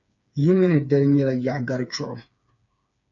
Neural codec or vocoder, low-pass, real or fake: codec, 16 kHz, 4 kbps, FreqCodec, smaller model; 7.2 kHz; fake